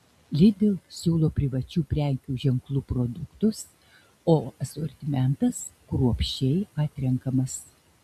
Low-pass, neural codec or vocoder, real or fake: 14.4 kHz; none; real